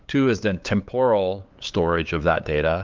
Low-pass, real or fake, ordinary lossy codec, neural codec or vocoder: 7.2 kHz; fake; Opus, 24 kbps; codec, 16 kHz, 8 kbps, FunCodec, trained on LibriTTS, 25 frames a second